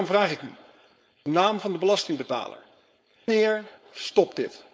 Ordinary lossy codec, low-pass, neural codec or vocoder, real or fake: none; none; codec, 16 kHz, 4.8 kbps, FACodec; fake